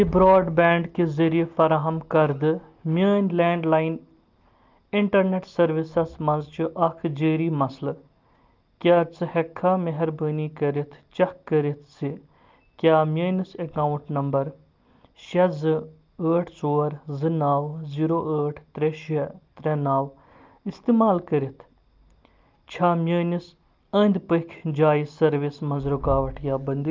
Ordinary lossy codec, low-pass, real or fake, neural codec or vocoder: Opus, 32 kbps; 7.2 kHz; real; none